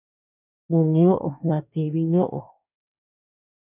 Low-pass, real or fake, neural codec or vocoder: 3.6 kHz; fake; codec, 24 kHz, 1 kbps, SNAC